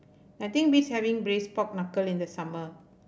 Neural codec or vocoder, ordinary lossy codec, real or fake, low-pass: none; none; real; none